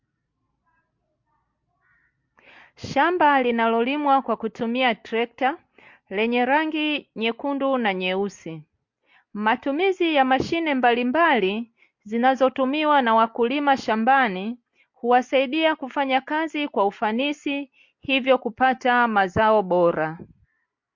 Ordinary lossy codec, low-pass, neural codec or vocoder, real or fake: MP3, 48 kbps; 7.2 kHz; none; real